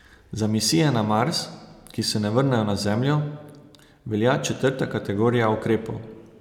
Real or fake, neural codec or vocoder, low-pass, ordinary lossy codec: real; none; 19.8 kHz; none